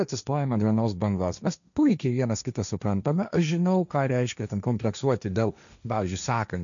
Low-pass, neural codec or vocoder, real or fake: 7.2 kHz; codec, 16 kHz, 1.1 kbps, Voila-Tokenizer; fake